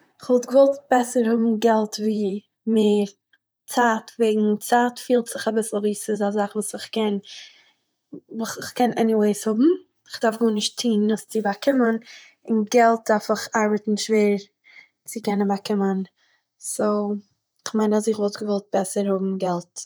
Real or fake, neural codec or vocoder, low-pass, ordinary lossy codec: fake; vocoder, 44.1 kHz, 128 mel bands, Pupu-Vocoder; none; none